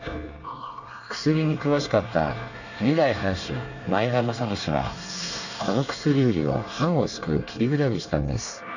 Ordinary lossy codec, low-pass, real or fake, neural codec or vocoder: none; 7.2 kHz; fake; codec, 24 kHz, 1 kbps, SNAC